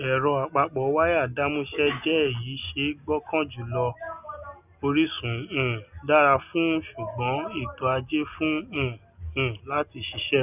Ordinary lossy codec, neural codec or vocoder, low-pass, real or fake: none; none; 3.6 kHz; real